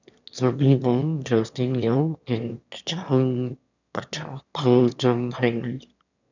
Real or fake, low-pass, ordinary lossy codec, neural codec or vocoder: fake; 7.2 kHz; none; autoencoder, 22.05 kHz, a latent of 192 numbers a frame, VITS, trained on one speaker